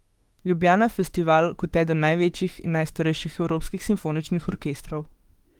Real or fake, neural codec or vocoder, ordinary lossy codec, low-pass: fake; autoencoder, 48 kHz, 32 numbers a frame, DAC-VAE, trained on Japanese speech; Opus, 24 kbps; 19.8 kHz